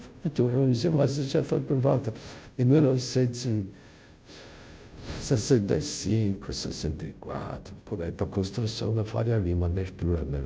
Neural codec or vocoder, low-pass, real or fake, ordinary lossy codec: codec, 16 kHz, 0.5 kbps, FunCodec, trained on Chinese and English, 25 frames a second; none; fake; none